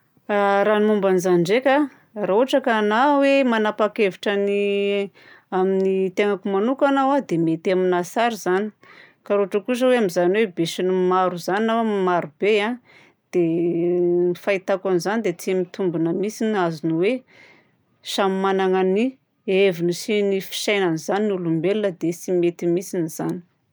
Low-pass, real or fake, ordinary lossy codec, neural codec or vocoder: none; real; none; none